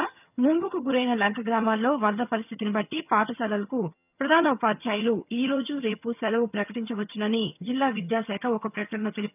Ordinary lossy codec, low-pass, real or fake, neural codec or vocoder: none; 3.6 kHz; fake; vocoder, 22.05 kHz, 80 mel bands, HiFi-GAN